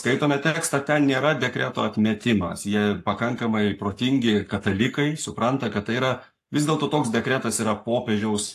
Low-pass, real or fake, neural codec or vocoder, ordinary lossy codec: 14.4 kHz; fake; codec, 44.1 kHz, 7.8 kbps, Pupu-Codec; AAC, 64 kbps